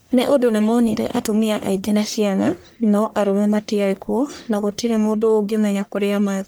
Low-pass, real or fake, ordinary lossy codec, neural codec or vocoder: none; fake; none; codec, 44.1 kHz, 1.7 kbps, Pupu-Codec